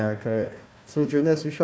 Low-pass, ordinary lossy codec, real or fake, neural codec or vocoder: none; none; fake; codec, 16 kHz, 1 kbps, FunCodec, trained on Chinese and English, 50 frames a second